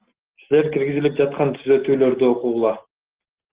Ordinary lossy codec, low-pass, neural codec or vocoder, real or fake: Opus, 16 kbps; 3.6 kHz; none; real